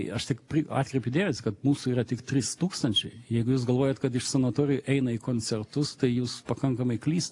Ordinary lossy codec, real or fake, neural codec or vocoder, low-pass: AAC, 48 kbps; real; none; 10.8 kHz